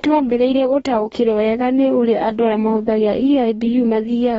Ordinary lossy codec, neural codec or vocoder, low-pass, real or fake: AAC, 24 kbps; codec, 44.1 kHz, 2.6 kbps, DAC; 19.8 kHz; fake